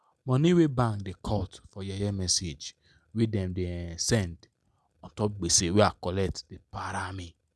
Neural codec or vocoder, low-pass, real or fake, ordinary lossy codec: none; none; real; none